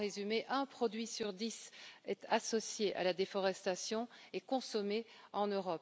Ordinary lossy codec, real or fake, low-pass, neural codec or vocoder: none; real; none; none